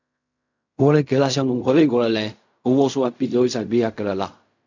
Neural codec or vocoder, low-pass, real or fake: codec, 16 kHz in and 24 kHz out, 0.4 kbps, LongCat-Audio-Codec, fine tuned four codebook decoder; 7.2 kHz; fake